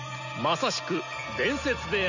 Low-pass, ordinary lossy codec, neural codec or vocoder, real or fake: 7.2 kHz; none; none; real